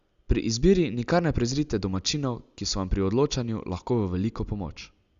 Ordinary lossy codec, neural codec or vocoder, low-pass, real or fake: Opus, 64 kbps; none; 7.2 kHz; real